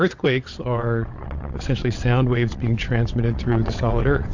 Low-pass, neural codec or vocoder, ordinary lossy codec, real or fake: 7.2 kHz; vocoder, 22.05 kHz, 80 mel bands, WaveNeXt; AAC, 48 kbps; fake